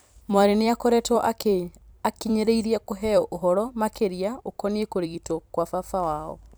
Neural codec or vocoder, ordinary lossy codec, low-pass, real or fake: vocoder, 44.1 kHz, 128 mel bands every 256 samples, BigVGAN v2; none; none; fake